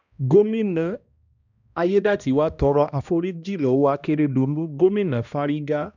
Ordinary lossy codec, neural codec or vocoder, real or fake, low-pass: none; codec, 16 kHz, 1 kbps, X-Codec, HuBERT features, trained on balanced general audio; fake; 7.2 kHz